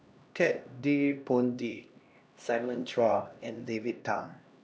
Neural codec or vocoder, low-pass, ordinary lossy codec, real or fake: codec, 16 kHz, 1 kbps, X-Codec, HuBERT features, trained on LibriSpeech; none; none; fake